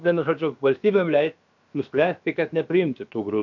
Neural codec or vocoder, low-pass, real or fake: codec, 16 kHz, about 1 kbps, DyCAST, with the encoder's durations; 7.2 kHz; fake